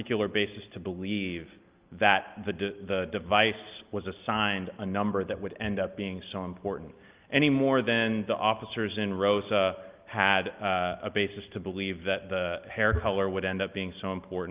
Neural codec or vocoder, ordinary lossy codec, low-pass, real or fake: none; Opus, 32 kbps; 3.6 kHz; real